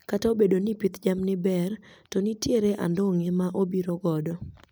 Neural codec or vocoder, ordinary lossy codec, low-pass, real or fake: none; none; none; real